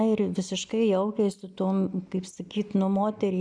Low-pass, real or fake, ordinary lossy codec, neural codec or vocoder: 9.9 kHz; real; MP3, 96 kbps; none